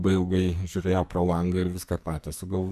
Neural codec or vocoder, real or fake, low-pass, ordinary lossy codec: codec, 44.1 kHz, 2.6 kbps, SNAC; fake; 14.4 kHz; AAC, 96 kbps